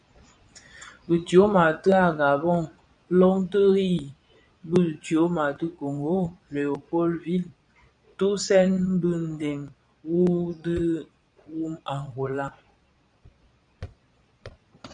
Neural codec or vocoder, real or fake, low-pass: vocoder, 22.05 kHz, 80 mel bands, Vocos; fake; 9.9 kHz